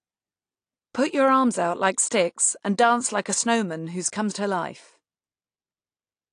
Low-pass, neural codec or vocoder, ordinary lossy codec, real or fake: 9.9 kHz; none; AAC, 48 kbps; real